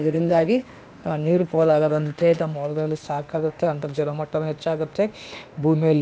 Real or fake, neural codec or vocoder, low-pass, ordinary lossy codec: fake; codec, 16 kHz, 0.8 kbps, ZipCodec; none; none